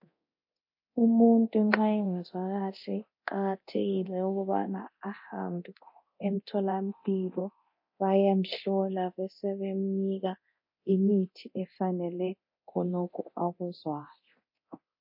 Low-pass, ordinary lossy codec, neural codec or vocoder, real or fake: 5.4 kHz; MP3, 32 kbps; codec, 24 kHz, 0.9 kbps, DualCodec; fake